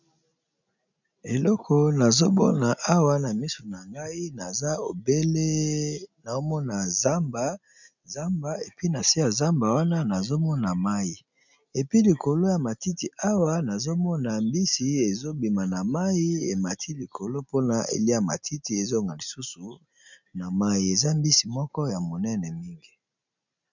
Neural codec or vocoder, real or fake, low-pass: none; real; 7.2 kHz